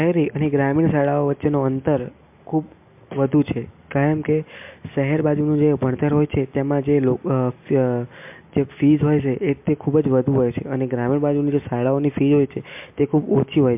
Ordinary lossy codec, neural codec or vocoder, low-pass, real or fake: MP3, 32 kbps; none; 3.6 kHz; real